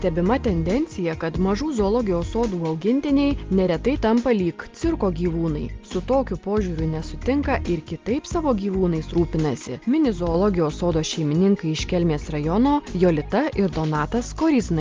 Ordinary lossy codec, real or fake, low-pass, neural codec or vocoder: Opus, 32 kbps; real; 7.2 kHz; none